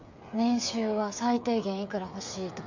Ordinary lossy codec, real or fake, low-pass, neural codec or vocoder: none; fake; 7.2 kHz; codec, 16 kHz, 8 kbps, FreqCodec, smaller model